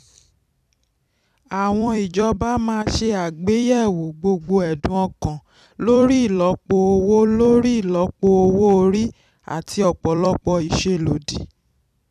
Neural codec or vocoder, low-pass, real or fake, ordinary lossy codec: vocoder, 44.1 kHz, 128 mel bands every 256 samples, BigVGAN v2; 14.4 kHz; fake; none